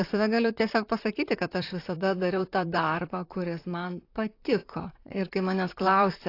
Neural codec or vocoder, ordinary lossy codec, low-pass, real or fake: vocoder, 44.1 kHz, 128 mel bands, Pupu-Vocoder; AAC, 32 kbps; 5.4 kHz; fake